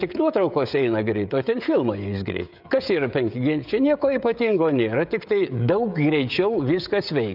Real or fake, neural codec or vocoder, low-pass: fake; codec, 16 kHz, 16 kbps, FreqCodec, smaller model; 5.4 kHz